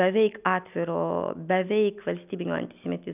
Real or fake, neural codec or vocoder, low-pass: real; none; 3.6 kHz